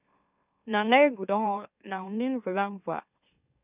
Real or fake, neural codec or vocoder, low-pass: fake; autoencoder, 44.1 kHz, a latent of 192 numbers a frame, MeloTTS; 3.6 kHz